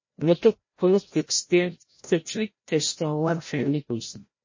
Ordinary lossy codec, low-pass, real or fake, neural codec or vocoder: MP3, 32 kbps; 7.2 kHz; fake; codec, 16 kHz, 0.5 kbps, FreqCodec, larger model